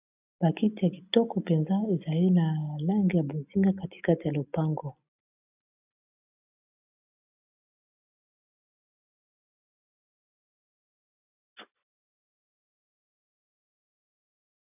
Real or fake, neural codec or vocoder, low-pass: real; none; 3.6 kHz